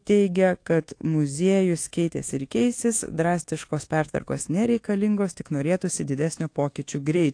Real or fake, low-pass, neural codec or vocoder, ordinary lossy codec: fake; 9.9 kHz; codec, 24 kHz, 1.2 kbps, DualCodec; AAC, 48 kbps